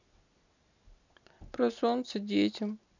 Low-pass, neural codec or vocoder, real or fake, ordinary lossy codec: 7.2 kHz; none; real; none